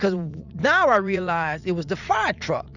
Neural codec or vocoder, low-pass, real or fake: vocoder, 44.1 kHz, 80 mel bands, Vocos; 7.2 kHz; fake